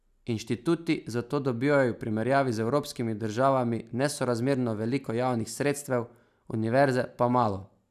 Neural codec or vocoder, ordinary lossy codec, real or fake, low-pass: none; none; real; 14.4 kHz